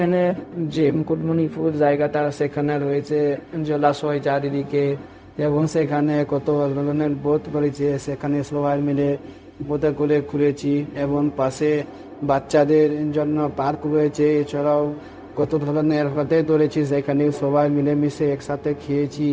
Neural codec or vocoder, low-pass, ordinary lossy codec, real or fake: codec, 16 kHz, 0.4 kbps, LongCat-Audio-Codec; none; none; fake